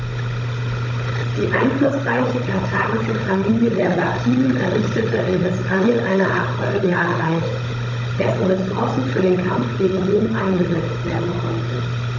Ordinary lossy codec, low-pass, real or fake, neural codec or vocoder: none; 7.2 kHz; fake; codec, 16 kHz, 16 kbps, FunCodec, trained on Chinese and English, 50 frames a second